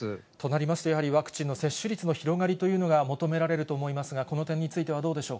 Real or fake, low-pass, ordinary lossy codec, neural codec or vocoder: real; none; none; none